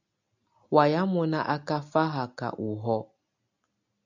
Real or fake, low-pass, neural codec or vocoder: real; 7.2 kHz; none